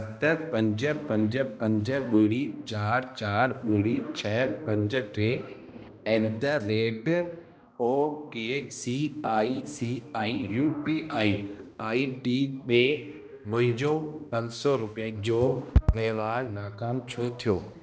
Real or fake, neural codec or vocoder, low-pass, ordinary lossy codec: fake; codec, 16 kHz, 1 kbps, X-Codec, HuBERT features, trained on balanced general audio; none; none